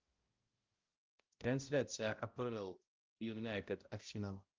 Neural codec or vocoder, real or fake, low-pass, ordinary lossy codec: codec, 16 kHz, 0.5 kbps, X-Codec, HuBERT features, trained on balanced general audio; fake; 7.2 kHz; Opus, 32 kbps